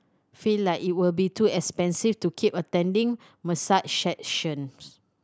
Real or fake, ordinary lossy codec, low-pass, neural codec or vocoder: real; none; none; none